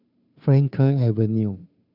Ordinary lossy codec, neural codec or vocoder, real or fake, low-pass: none; codec, 16 kHz, 2 kbps, FunCodec, trained on Chinese and English, 25 frames a second; fake; 5.4 kHz